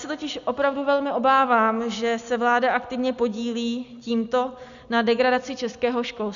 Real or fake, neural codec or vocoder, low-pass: real; none; 7.2 kHz